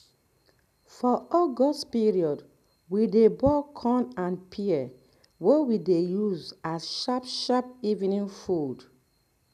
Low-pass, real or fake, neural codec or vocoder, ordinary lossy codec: 14.4 kHz; real; none; none